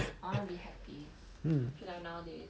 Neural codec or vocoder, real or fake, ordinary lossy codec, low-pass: none; real; none; none